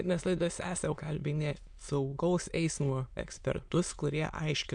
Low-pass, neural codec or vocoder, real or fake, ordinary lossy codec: 9.9 kHz; autoencoder, 22.05 kHz, a latent of 192 numbers a frame, VITS, trained on many speakers; fake; MP3, 64 kbps